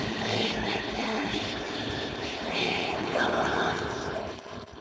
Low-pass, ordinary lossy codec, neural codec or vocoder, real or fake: none; none; codec, 16 kHz, 4.8 kbps, FACodec; fake